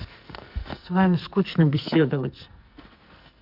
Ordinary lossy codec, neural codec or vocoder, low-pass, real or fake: Opus, 64 kbps; codec, 44.1 kHz, 2.6 kbps, SNAC; 5.4 kHz; fake